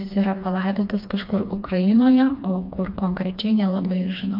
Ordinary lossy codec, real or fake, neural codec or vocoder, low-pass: MP3, 48 kbps; fake; codec, 16 kHz, 2 kbps, FreqCodec, smaller model; 5.4 kHz